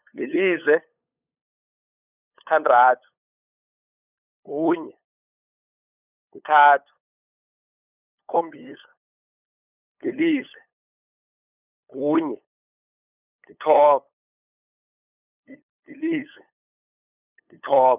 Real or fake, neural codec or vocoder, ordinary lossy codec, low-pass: fake; codec, 16 kHz, 8 kbps, FunCodec, trained on LibriTTS, 25 frames a second; none; 3.6 kHz